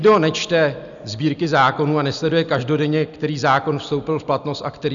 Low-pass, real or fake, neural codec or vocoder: 7.2 kHz; real; none